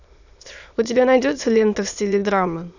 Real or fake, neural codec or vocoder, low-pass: fake; autoencoder, 22.05 kHz, a latent of 192 numbers a frame, VITS, trained on many speakers; 7.2 kHz